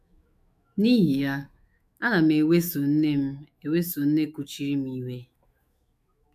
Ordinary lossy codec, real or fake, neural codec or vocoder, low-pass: none; fake; autoencoder, 48 kHz, 128 numbers a frame, DAC-VAE, trained on Japanese speech; 14.4 kHz